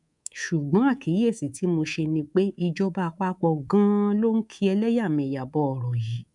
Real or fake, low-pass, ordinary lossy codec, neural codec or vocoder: fake; 10.8 kHz; none; codec, 24 kHz, 3.1 kbps, DualCodec